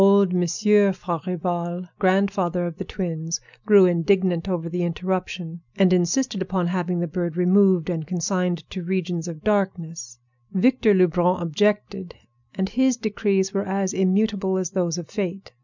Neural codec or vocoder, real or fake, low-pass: none; real; 7.2 kHz